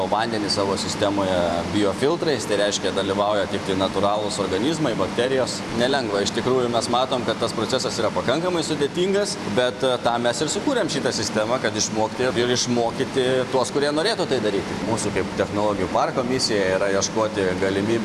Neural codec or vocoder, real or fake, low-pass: vocoder, 44.1 kHz, 128 mel bands every 512 samples, BigVGAN v2; fake; 14.4 kHz